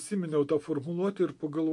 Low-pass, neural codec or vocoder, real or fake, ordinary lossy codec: 10.8 kHz; none; real; MP3, 48 kbps